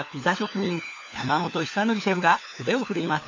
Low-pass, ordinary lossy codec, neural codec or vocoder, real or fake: 7.2 kHz; MP3, 48 kbps; codec, 16 kHz, 4 kbps, FunCodec, trained on LibriTTS, 50 frames a second; fake